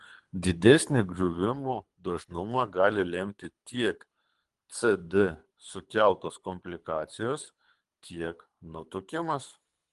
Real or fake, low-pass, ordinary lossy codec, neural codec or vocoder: fake; 10.8 kHz; Opus, 32 kbps; codec, 24 kHz, 3 kbps, HILCodec